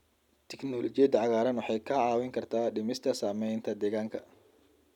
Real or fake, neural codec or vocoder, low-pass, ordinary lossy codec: real; none; 19.8 kHz; none